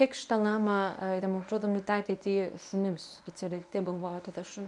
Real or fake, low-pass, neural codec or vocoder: fake; 10.8 kHz; codec, 24 kHz, 0.9 kbps, WavTokenizer, medium speech release version 1